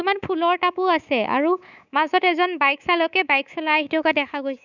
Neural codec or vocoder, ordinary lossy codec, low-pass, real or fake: codec, 24 kHz, 3.1 kbps, DualCodec; none; 7.2 kHz; fake